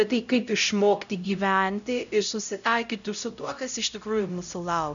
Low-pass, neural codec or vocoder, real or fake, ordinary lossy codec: 7.2 kHz; codec, 16 kHz, 0.5 kbps, X-Codec, HuBERT features, trained on LibriSpeech; fake; MP3, 96 kbps